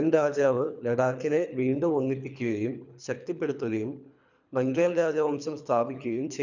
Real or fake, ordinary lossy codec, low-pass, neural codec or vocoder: fake; none; 7.2 kHz; codec, 24 kHz, 3 kbps, HILCodec